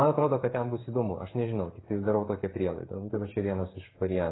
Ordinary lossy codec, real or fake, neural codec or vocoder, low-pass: AAC, 16 kbps; fake; codec, 16 kHz, 8 kbps, FreqCodec, smaller model; 7.2 kHz